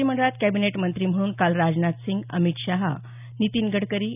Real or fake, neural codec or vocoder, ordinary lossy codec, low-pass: real; none; none; 3.6 kHz